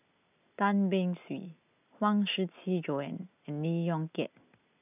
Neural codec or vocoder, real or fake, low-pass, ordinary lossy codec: none; real; 3.6 kHz; none